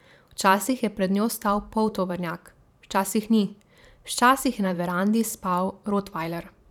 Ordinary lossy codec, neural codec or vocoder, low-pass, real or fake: none; vocoder, 44.1 kHz, 128 mel bands every 512 samples, BigVGAN v2; 19.8 kHz; fake